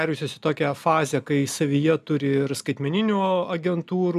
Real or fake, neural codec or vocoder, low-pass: real; none; 14.4 kHz